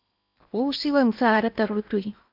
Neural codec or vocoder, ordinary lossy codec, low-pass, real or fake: codec, 16 kHz in and 24 kHz out, 0.8 kbps, FocalCodec, streaming, 65536 codes; MP3, 48 kbps; 5.4 kHz; fake